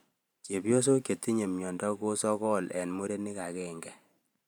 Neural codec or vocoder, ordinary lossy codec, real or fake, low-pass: vocoder, 44.1 kHz, 128 mel bands every 512 samples, BigVGAN v2; none; fake; none